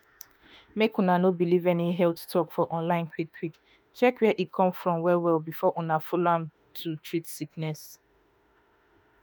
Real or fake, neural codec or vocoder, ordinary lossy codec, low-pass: fake; autoencoder, 48 kHz, 32 numbers a frame, DAC-VAE, trained on Japanese speech; none; none